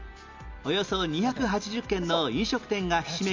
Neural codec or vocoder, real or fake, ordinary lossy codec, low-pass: none; real; MP3, 64 kbps; 7.2 kHz